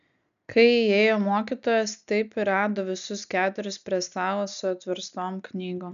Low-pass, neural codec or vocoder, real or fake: 7.2 kHz; none; real